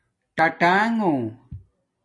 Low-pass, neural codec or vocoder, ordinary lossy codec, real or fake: 10.8 kHz; none; AAC, 32 kbps; real